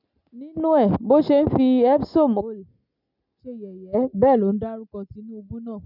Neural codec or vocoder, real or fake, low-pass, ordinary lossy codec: none; real; 5.4 kHz; none